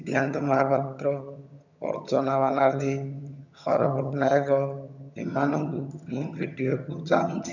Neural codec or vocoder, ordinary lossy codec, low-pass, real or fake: vocoder, 22.05 kHz, 80 mel bands, HiFi-GAN; none; 7.2 kHz; fake